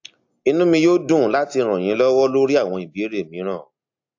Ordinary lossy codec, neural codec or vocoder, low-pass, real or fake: AAC, 48 kbps; none; 7.2 kHz; real